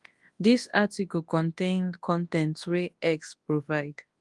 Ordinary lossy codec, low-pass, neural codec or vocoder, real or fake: Opus, 24 kbps; 10.8 kHz; codec, 24 kHz, 0.9 kbps, WavTokenizer, large speech release; fake